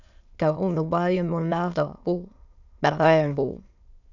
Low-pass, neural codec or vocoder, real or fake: 7.2 kHz; autoencoder, 22.05 kHz, a latent of 192 numbers a frame, VITS, trained on many speakers; fake